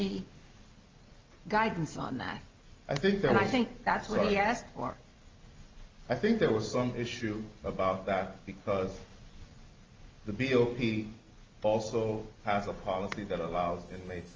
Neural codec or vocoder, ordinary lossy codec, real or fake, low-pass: none; Opus, 24 kbps; real; 7.2 kHz